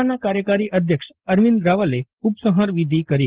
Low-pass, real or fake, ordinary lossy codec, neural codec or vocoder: 3.6 kHz; real; Opus, 16 kbps; none